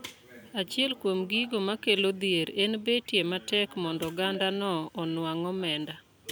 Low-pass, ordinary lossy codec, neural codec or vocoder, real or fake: none; none; none; real